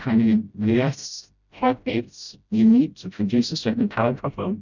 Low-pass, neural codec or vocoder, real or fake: 7.2 kHz; codec, 16 kHz, 0.5 kbps, FreqCodec, smaller model; fake